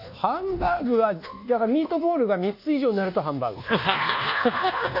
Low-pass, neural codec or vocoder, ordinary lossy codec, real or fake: 5.4 kHz; codec, 24 kHz, 1.2 kbps, DualCodec; none; fake